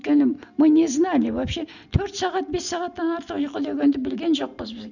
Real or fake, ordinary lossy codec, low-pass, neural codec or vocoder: real; none; 7.2 kHz; none